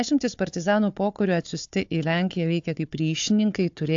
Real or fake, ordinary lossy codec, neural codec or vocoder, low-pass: fake; AAC, 64 kbps; codec, 16 kHz, 4 kbps, FunCodec, trained on Chinese and English, 50 frames a second; 7.2 kHz